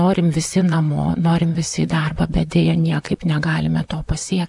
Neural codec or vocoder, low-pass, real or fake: vocoder, 44.1 kHz, 128 mel bands, Pupu-Vocoder; 10.8 kHz; fake